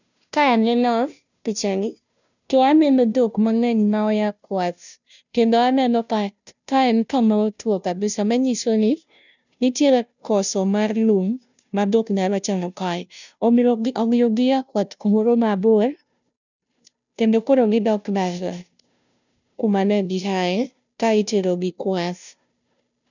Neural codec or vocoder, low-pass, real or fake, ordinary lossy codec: codec, 16 kHz, 0.5 kbps, FunCodec, trained on Chinese and English, 25 frames a second; 7.2 kHz; fake; none